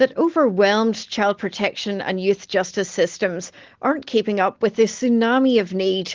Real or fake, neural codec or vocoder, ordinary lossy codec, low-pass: real; none; Opus, 16 kbps; 7.2 kHz